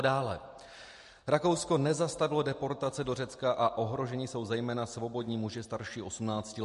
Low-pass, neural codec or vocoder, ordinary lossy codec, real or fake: 14.4 kHz; none; MP3, 48 kbps; real